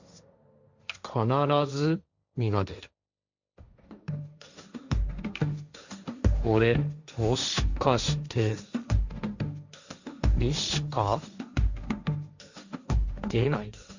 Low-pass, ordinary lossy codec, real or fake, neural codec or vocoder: 7.2 kHz; Opus, 64 kbps; fake; codec, 16 kHz, 1.1 kbps, Voila-Tokenizer